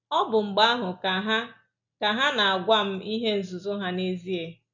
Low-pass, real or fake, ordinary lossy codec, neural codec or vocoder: 7.2 kHz; real; none; none